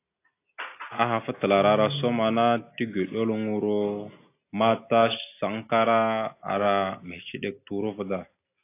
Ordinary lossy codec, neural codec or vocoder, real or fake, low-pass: AAC, 24 kbps; none; real; 3.6 kHz